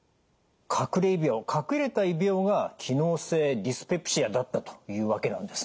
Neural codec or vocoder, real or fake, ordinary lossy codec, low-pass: none; real; none; none